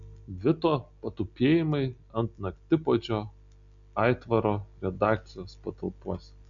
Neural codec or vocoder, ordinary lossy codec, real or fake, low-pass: none; MP3, 96 kbps; real; 7.2 kHz